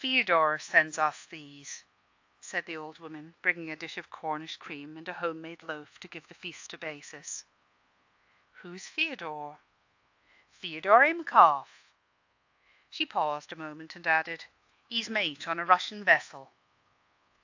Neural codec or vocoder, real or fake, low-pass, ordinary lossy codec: codec, 24 kHz, 1.2 kbps, DualCodec; fake; 7.2 kHz; AAC, 48 kbps